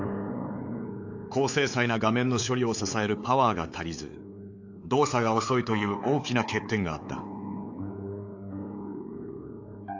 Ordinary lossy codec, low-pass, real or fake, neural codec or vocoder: AAC, 48 kbps; 7.2 kHz; fake; codec, 16 kHz, 4 kbps, X-Codec, WavLM features, trained on Multilingual LibriSpeech